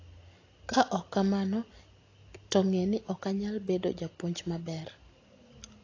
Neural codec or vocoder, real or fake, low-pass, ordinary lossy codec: none; real; 7.2 kHz; AAC, 32 kbps